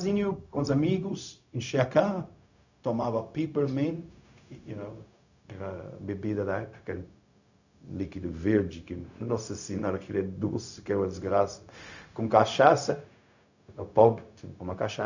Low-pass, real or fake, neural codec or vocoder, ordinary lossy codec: 7.2 kHz; fake; codec, 16 kHz, 0.4 kbps, LongCat-Audio-Codec; none